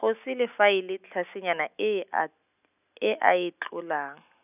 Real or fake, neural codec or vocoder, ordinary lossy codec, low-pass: real; none; none; 3.6 kHz